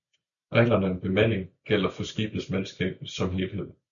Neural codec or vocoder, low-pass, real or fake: none; 7.2 kHz; real